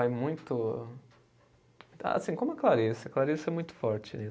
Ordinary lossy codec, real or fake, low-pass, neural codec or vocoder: none; real; none; none